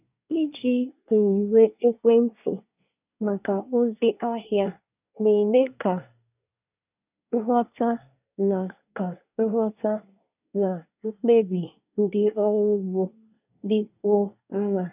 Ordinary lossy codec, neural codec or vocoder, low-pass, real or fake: none; codec, 24 kHz, 1 kbps, SNAC; 3.6 kHz; fake